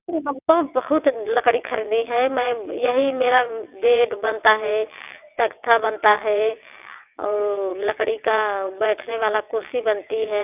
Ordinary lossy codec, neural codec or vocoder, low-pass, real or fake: none; vocoder, 22.05 kHz, 80 mel bands, WaveNeXt; 3.6 kHz; fake